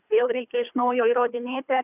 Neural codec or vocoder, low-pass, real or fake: codec, 24 kHz, 3 kbps, HILCodec; 3.6 kHz; fake